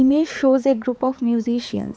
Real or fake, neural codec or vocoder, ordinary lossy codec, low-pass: fake; codec, 16 kHz, 4 kbps, X-Codec, HuBERT features, trained on balanced general audio; none; none